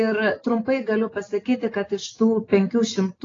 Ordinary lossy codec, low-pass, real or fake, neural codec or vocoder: AAC, 32 kbps; 7.2 kHz; real; none